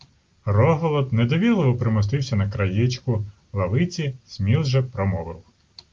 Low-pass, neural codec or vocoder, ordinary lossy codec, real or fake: 7.2 kHz; none; Opus, 32 kbps; real